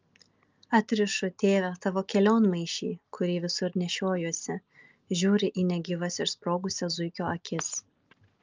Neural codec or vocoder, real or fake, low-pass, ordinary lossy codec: none; real; 7.2 kHz; Opus, 24 kbps